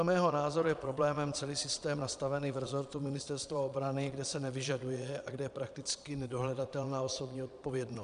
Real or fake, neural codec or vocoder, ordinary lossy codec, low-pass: fake; vocoder, 22.05 kHz, 80 mel bands, Vocos; MP3, 96 kbps; 9.9 kHz